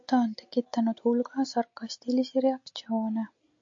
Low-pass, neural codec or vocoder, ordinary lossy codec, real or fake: 7.2 kHz; none; MP3, 48 kbps; real